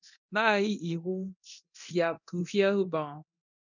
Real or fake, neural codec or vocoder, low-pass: fake; codec, 24 kHz, 0.9 kbps, DualCodec; 7.2 kHz